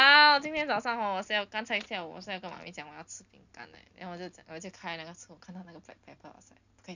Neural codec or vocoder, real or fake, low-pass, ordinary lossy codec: none; real; 7.2 kHz; none